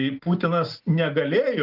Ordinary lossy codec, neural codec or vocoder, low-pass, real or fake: Opus, 32 kbps; none; 5.4 kHz; real